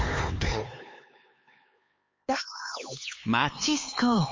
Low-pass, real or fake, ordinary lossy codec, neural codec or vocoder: 7.2 kHz; fake; MP3, 32 kbps; codec, 16 kHz, 4 kbps, X-Codec, HuBERT features, trained on LibriSpeech